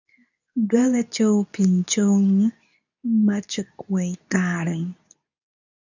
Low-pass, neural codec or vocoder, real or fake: 7.2 kHz; codec, 24 kHz, 0.9 kbps, WavTokenizer, medium speech release version 2; fake